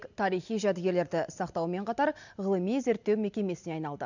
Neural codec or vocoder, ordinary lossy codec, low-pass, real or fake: none; none; 7.2 kHz; real